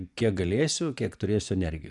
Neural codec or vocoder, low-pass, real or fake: vocoder, 24 kHz, 100 mel bands, Vocos; 10.8 kHz; fake